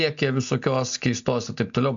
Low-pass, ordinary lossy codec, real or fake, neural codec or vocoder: 7.2 kHz; AAC, 64 kbps; real; none